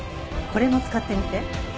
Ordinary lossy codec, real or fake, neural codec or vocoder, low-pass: none; real; none; none